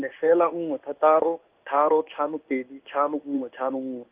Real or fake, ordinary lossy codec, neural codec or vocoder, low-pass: fake; Opus, 32 kbps; codec, 16 kHz in and 24 kHz out, 1 kbps, XY-Tokenizer; 3.6 kHz